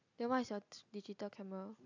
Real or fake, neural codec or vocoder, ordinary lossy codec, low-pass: real; none; none; 7.2 kHz